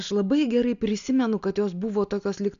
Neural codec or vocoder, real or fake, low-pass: none; real; 7.2 kHz